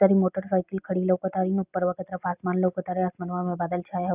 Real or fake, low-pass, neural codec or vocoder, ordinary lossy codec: real; 3.6 kHz; none; none